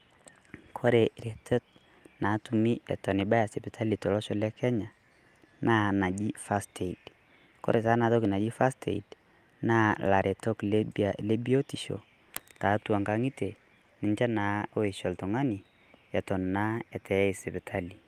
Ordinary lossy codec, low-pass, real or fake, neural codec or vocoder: Opus, 32 kbps; 14.4 kHz; real; none